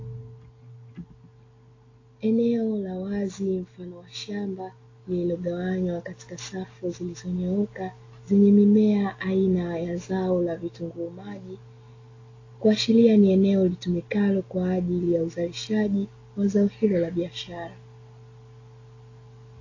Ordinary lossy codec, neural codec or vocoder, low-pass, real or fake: AAC, 32 kbps; none; 7.2 kHz; real